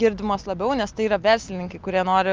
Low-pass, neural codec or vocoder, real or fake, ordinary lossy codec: 7.2 kHz; none; real; Opus, 24 kbps